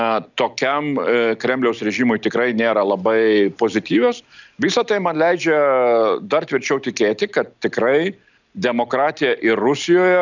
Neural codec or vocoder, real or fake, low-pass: none; real; 7.2 kHz